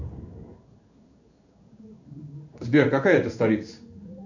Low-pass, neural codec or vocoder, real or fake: 7.2 kHz; codec, 16 kHz in and 24 kHz out, 1 kbps, XY-Tokenizer; fake